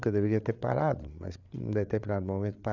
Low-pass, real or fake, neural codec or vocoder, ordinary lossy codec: 7.2 kHz; fake; codec, 16 kHz, 8 kbps, FreqCodec, larger model; none